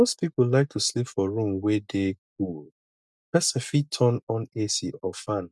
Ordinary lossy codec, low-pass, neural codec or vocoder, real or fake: none; none; none; real